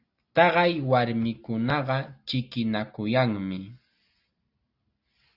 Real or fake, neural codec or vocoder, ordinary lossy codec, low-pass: real; none; Opus, 64 kbps; 5.4 kHz